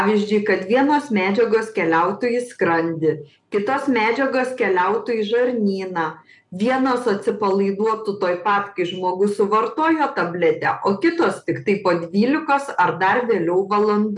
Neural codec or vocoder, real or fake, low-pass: none; real; 10.8 kHz